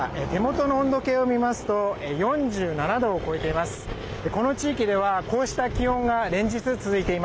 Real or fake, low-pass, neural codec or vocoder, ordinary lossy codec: real; none; none; none